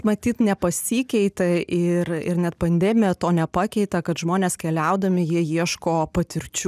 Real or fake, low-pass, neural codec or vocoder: real; 14.4 kHz; none